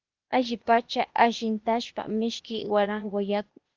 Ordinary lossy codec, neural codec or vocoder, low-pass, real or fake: Opus, 32 kbps; codec, 16 kHz, 0.8 kbps, ZipCodec; 7.2 kHz; fake